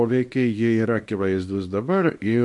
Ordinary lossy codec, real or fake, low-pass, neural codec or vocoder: MP3, 48 kbps; fake; 10.8 kHz; codec, 24 kHz, 0.9 kbps, WavTokenizer, small release